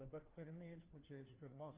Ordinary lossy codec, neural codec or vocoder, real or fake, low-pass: Opus, 24 kbps; codec, 16 kHz, 1 kbps, FunCodec, trained on LibriTTS, 50 frames a second; fake; 3.6 kHz